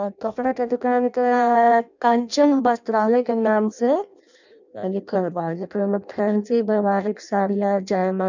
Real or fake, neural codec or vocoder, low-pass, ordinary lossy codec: fake; codec, 16 kHz in and 24 kHz out, 0.6 kbps, FireRedTTS-2 codec; 7.2 kHz; none